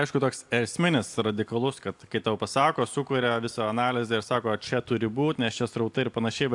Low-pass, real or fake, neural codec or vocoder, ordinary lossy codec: 10.8 kHz; real; none; AAC, 64 kbps